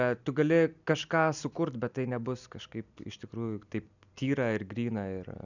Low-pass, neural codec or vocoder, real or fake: 7.2 kHz; none; real